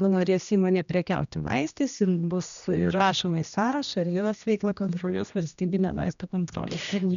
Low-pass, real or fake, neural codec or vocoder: 7.2 kHz; fake; codec, 16 kHz, 1 kbps, X-Codec, HuBERT features, trained on general audio